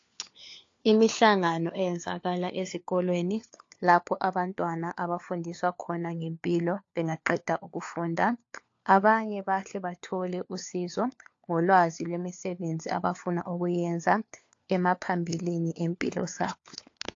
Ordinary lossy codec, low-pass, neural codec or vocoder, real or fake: AAC, 48 kbps; 7.2 kHz; codec, 16 kHz, 4 kbps, FunCodec, trained on LibriTTS, 50 frames a second; fake